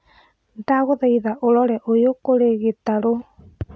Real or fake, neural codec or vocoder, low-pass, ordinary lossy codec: real; none; none; none